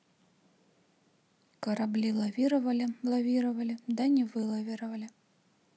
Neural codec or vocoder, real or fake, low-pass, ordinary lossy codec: none; real; none; none